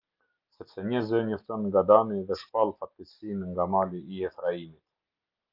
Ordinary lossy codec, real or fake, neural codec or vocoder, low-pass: Opus, 24 kbps; real; none; 5.4 kHz